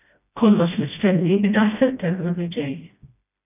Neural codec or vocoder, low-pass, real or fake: codec, 16 kHz, 1 kbps, FreqCodec, smaller model; 3.6 kHz; fake